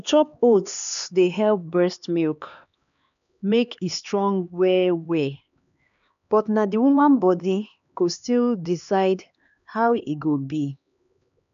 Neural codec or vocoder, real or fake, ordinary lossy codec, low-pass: codec, 16 kHz, 2 kbps, X-Codec, HuBERT features, trained on LibriSpeech; fake; none; 7.2 kHz